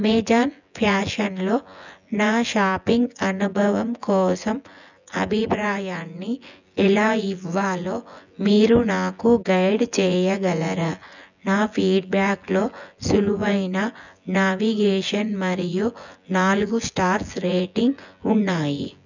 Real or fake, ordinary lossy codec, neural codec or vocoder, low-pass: fake; none; vocoder, 24 kHz, 100 mel bands, Vocos; 7.2 kHz